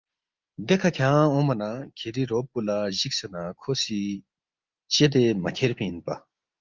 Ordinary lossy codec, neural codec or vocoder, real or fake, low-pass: Opus, 32 kbps; none; real; 7.2 kHz